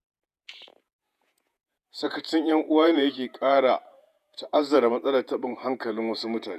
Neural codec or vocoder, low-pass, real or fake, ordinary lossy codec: vocoder, 48 kHz, 128 mel bands, Vocos; 14.4 kHz; fake; none